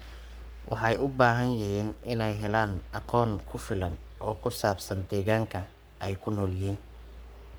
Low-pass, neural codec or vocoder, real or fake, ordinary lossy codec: none; codec, 44.1 kHz, 3.4 kbps, Pupu-Codec; fake; none